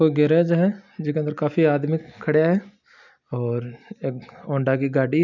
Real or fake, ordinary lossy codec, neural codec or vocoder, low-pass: real; none; none; 7.2 kHz